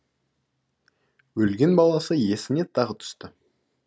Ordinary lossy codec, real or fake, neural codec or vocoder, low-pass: none; real; none; none